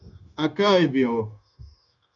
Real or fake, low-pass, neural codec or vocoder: fake; 7.2 kHz; codec, 16 kHz, 0.9 kbps, LongCat-Audio-Codec